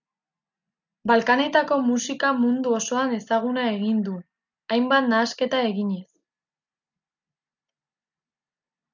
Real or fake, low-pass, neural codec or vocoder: real; 7.2 kHz; none